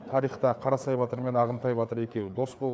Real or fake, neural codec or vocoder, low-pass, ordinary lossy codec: fake; codec, 16 kHz, 4 kbps, FreqCodec, larger model; none; none